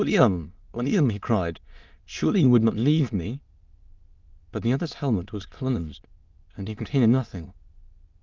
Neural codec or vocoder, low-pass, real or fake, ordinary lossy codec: autoencoder, 22.05 kHz, a latent of 192 numbers a frame, VITS, trained on many speakers; 7.2 kHz; fake; Opus, 32 kbps